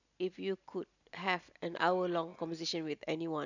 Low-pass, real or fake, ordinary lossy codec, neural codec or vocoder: 7.2 kHz; real; none; none